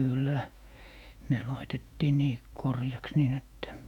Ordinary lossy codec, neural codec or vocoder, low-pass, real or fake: none; autoencoder, 48 kHz, 128 numbers a frame, DAC-VAE, trained on Japanese speech; 19.8 kHz; fake